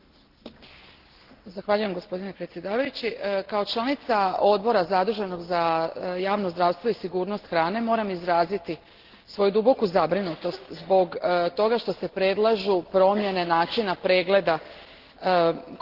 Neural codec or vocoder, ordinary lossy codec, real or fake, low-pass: none; Opus, 16 kbps; real; 5.4 kHz